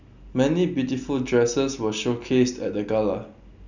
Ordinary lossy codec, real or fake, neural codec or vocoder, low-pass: none; real; none; 7.2 kHz